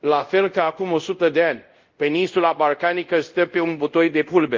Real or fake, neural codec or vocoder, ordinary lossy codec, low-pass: fake; codec, 24 kHz, 0.5 kbps, DualCodec; Opus, 32 kbps; 7.2 kHz